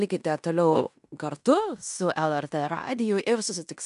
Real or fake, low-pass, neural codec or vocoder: fake; 10.8 kHz; codec, 16 kHz in and 24 kHz out, 0.9 kbps, LongCat-Audio-Codec, fine tuned four codebook decoder